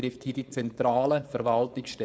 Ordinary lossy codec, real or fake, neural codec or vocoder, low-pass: none; fake; codec, 16 kHz, 4.8 kbps, FACodec; none